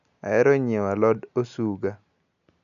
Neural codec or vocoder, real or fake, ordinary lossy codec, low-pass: none; real; none; 7.2 kHz